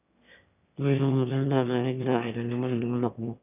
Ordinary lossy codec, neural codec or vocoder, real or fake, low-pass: none; autoencoder, 22.05 kHz, a latent of 192 numbers a frame, VITS, trained on one speaker; fake; 3.6 kHz